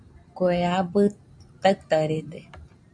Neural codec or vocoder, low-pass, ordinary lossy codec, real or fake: vocoder, 44.1 kHz, 128 mel bands every 512 samples, BigVGAN v2; 9.9 kHz; AAC, 64 kbps; fake